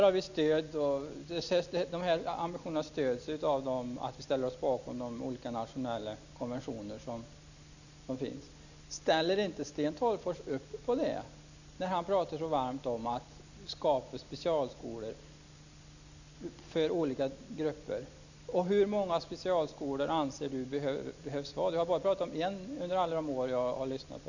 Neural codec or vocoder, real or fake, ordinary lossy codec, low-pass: none; real; none; 7.2 kHz